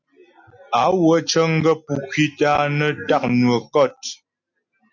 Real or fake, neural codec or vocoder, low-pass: real; none; 7.2 kHz